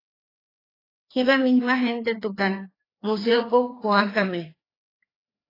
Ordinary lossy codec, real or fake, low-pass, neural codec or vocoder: AAC, 24 kbps; fake; 5.4 kHz; codec, 16 kHz, 2 kbps, FreqCodec, larger model